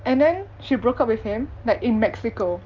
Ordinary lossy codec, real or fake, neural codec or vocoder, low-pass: Opus, 24 kbps; real; none; 7.2 kHz